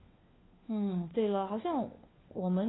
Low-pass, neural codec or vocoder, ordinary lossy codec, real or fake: 7.2 kHz; codec, 16 kHz, 4 kbps, FunCodec, trained on LibriTTS, 50 frames a second; AAC, 16 kbps; fake